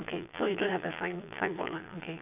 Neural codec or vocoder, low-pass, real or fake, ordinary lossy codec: vocoder, 22.05 kHz, 80 mel bands, Vocos; 3.6 kHz; fake; none